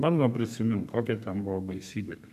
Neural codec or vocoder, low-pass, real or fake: codec, 32 kHz, 1.9 kbps, SNAC; 14.4 kHz; fake